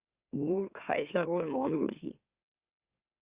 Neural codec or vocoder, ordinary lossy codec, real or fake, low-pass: autoencoder, 44.1 kHz, a latent of 192 numbers a frame, MeloTTS; Opus, 64 kbps; fake; 3.6 kHz